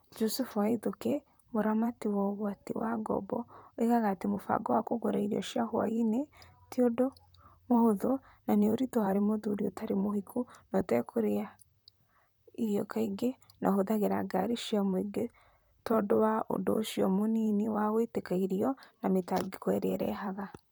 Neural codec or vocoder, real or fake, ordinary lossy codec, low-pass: vocoder, 44.1 kHz, 128 mel bands, Pupu-Vocoder; fake; none; none